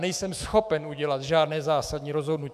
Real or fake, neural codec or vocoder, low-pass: fake; autoencoder, 48 kHz, 128 numbers a frame, DAC-VAE, trained on Japanese speech; 14.4 kHz